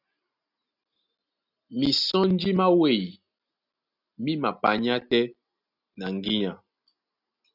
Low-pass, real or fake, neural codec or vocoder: 5.4 kHz; real; none